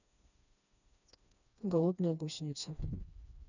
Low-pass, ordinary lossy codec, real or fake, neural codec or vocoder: 7.2 kHz; none; fake; codec, 16 kHz, 2 kbps, FreqCodec, smaller model